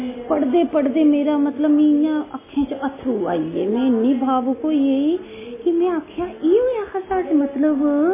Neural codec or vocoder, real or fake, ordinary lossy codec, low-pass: none; real; MP3, 16 kbps; 3.6 kHz